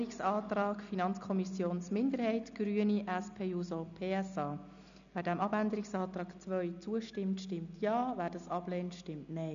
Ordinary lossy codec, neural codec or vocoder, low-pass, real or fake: none; none; 7.2 kHz; real